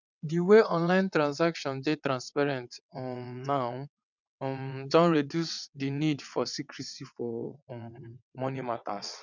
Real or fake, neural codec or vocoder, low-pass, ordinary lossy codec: fake; vocoder, 22.05 kHz, 80 mel bands, WaveNeXt; 7.2 kHz; none